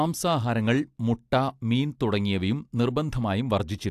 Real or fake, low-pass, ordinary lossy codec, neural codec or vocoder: real; 14.4 kHz; AAC, 64 kbps; none